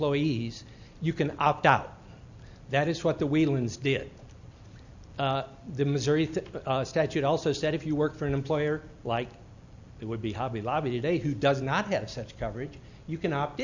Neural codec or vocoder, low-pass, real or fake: none; 7.2 kHz; real